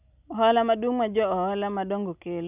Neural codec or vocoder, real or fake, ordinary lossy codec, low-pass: none; real; none; 3.6 kHz